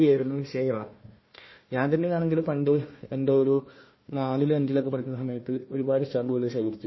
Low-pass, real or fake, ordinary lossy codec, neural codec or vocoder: 7.2 kHz; fake; MP3, 24 kbps; codec, 16 kHz, 1 kbps, FunCodec, trained on Chinese and English, 50 frames a second